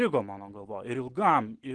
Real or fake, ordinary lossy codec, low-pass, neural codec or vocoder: real; Opus, 16 kbps; 10.8 kHz; none